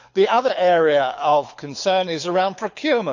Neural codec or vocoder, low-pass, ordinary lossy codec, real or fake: codec, 24 kHz, 6 kbps, HILCodec; 7.2 kHz; none; fake